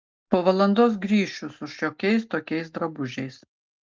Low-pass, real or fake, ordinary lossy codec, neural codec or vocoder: 7.2 kHz; real; Opus, 32 kbps; none